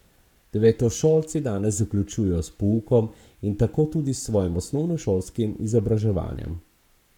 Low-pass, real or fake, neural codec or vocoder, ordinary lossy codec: 19.8 kHz; fake; codec, 44.1 kHz, 7.8 kbps, Pupu-Codec; none